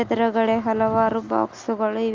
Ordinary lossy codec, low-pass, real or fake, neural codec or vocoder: Opus, 32 kbps; 7.2 kHz; real; none